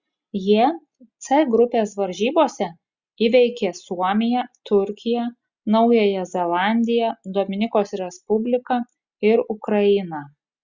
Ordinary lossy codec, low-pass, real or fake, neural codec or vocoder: Opus, 64 kbps; 7.2 kHz; real; none